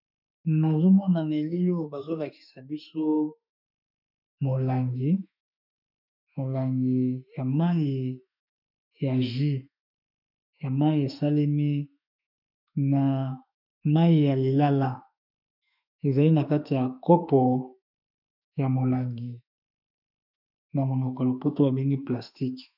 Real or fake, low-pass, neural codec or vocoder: fake; 5.4 kHz; autoencoder, 48 kHz, 32 numbers a frame, DAC-VAE, trained on Japanese speech